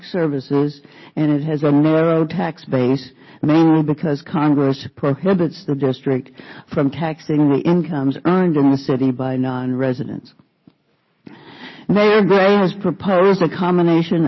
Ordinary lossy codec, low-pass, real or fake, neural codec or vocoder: MP3, 24 kbps; 7.2 kHz; real; none